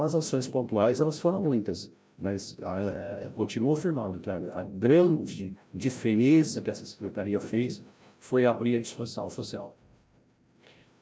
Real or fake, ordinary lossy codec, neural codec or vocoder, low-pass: fake; none; codec, 16 kHz, 0.5 kbps, FreqCodec, larger model; none